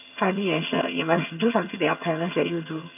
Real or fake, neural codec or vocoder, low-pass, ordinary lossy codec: fake; vocoder, 22.05 kHz, 80 mel bands, HiFi-GAN; 3.6 kHz; none